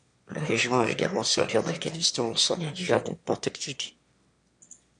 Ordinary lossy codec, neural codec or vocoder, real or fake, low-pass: MP3, 64 kbps; autoencoder, 22.05 kHz, a latent of 192 numbers a frame, VITS, trained on one speaker; fake; 9.9 kHz